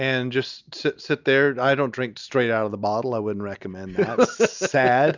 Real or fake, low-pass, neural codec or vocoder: real; 7.2 kHz; none